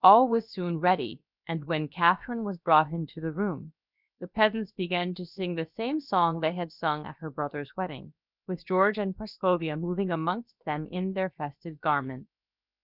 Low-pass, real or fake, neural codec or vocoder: 5.4 kHz; fake; codec, 16 kHz, about 1 kbps, DyCAST, with the encoder's durations